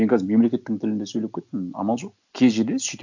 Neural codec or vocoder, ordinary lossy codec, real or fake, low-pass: none; none; real; 7.2 kHz